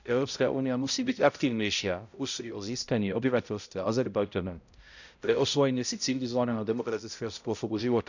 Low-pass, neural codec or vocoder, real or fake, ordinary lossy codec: 7.2 kHz; codec, 16 kHz, 0.5 kbps, X-Codec, HuBERT features, trained on balanced general audio; fake; none